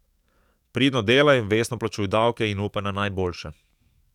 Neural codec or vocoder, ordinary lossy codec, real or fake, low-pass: codec, 44.1 kHz, 7.8 kbps, DAC; none; fake; 19.8 kHz